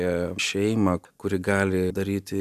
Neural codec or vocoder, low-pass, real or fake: none; 14.4 kHz; real